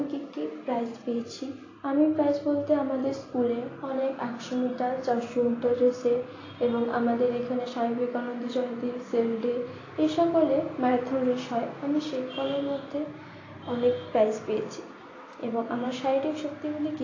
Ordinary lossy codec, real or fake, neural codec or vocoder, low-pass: AAC, 32 kbps; real; none; 7.2 kHz